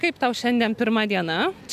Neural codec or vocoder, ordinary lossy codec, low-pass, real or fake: none; MP3, 96 kbps; 14.4 kHz; real